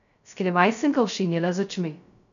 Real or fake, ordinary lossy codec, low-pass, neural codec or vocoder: fake; none; 7.2 kHz; codec, 16 kHz, 0.2 kbps, FocalCodec